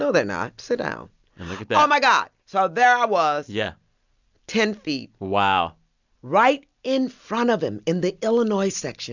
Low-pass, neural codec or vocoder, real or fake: 7.2 kHz; none; real